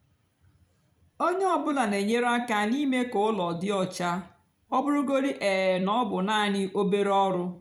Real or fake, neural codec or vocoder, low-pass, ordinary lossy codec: fake; vocoder, 44.1 kHz, 128 mel bands every 256 samples, BigVGAN v2; 19.8 kHz; none